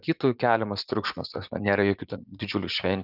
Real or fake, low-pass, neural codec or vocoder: fake; 5.4 kHz; vocoder, 44.1 kHz, 80 mel bands, Vocos